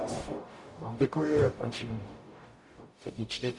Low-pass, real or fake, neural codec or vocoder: 10.8 kHz; fake; codec, 44.1 kHz, 0.9 kbps, DAC